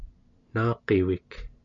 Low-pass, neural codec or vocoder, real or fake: 7.2 kHz; none; real